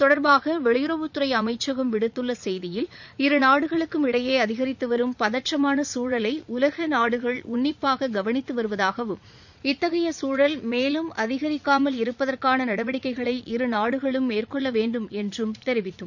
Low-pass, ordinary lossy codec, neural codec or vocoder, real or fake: 7.2 kHz; none; vocoder, 44.1 kHz, 80 mel bands, Vocos; fake